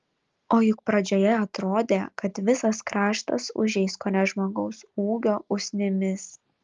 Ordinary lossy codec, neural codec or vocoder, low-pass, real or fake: Opus, 32 kbps; none; 7.2 kHz; real